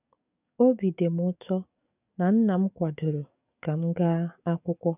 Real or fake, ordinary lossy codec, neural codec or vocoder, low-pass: fake; none; codec, 44.1 kHz, 7.8 kbps, DAC; 3.6 kHz